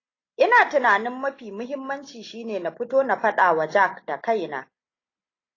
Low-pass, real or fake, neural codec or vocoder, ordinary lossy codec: 7.2 kHz; real; none; AAC, 32 kbps